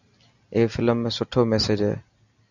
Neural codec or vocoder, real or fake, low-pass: none; real; 7.2 kHz